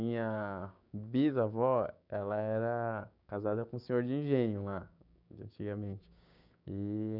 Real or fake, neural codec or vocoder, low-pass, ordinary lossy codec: fake; codec, 16 kHz, 6 kbps, DAC; 5.4 kHz; none